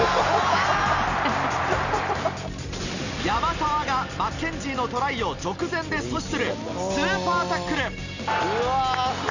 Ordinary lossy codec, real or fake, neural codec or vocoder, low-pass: none; real; none; 7.2 kHz